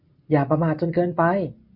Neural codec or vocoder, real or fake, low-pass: none; real; 5.4 kHz